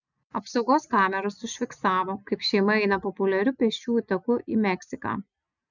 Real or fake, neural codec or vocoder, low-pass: real; none; 7.2 kHz